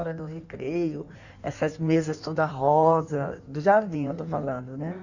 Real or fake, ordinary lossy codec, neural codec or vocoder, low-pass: fake; none; codec, 16 kHz in and 24 kHz out, 1.1 kbps, FireRedTTS-2 codec; 7.2 kHz